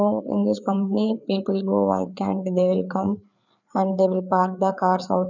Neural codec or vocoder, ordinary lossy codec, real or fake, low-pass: codec, 16 kHz, 4 kbps, FreqCodec, larger model; none; fake; 7.2 kHz